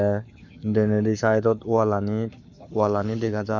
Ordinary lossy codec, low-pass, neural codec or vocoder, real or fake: none; 7.2 kHz; codec, 44.1 kHz, 7.8 kbps, Pupu-Codec; fake